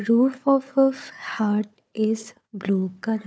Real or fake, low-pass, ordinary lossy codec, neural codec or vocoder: fake; none; none; codec, 16 kHz, 4 kbps, FunCodec, trained on Chinese and English, 50 frames a second